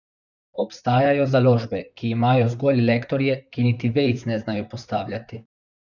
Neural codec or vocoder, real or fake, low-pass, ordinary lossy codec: vocoder, 44.1 kHz, 128 mel bands, Pupu-Vocoder; fake; 7.2 kHz; none